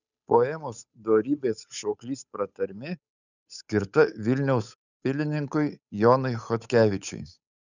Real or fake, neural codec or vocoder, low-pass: fake; codec, 16 kHz, 8 kbps, FunCodec, trained on Chinese and English, 25 frames a second; 7.2 kHz